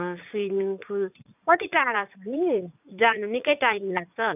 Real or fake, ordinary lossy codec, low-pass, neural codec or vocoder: fake; none; 3.6 kHz; vocoder, 44.1 kHz, 80 mel bands, Vocos